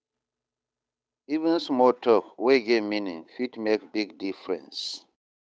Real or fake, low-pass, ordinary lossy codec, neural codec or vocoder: fake; none; none; codec, 16 kHz, 8 kbps, FunCodec, trained on Chinese and English, 25 frames a second